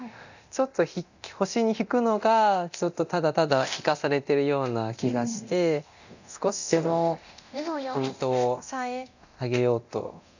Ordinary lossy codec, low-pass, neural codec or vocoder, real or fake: none; 7.2 kHz; codec, 24 kHz, 0.9 kbps, DualCodec; fake